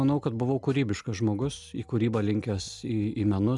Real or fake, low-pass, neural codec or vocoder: real; 10.8 kHz; none